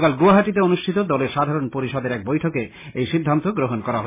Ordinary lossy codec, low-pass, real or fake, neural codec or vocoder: MP3, 16 kbps; 3.6 kHz; real; none